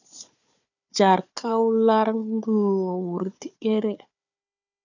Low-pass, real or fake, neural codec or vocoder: 7.2 kHz; fake; codec, 16 kHz, 4 kbps, FunCodec, trained on Chinese and English, 50 frames a second